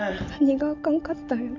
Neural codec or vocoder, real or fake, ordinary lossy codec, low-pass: none; real; none; 7.2 kHz